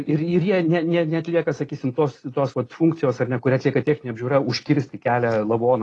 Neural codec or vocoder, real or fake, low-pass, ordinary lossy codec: none; real; 10.8 kHz; AAC, 32 kbps